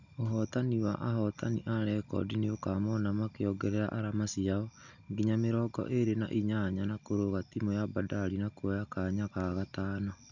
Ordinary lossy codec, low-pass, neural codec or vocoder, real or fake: none; 7.2 kHz; none; real